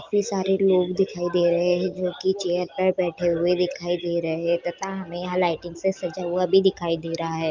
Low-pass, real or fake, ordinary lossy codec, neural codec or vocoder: 7.2 kHz; real; Opus, 24 kbps; none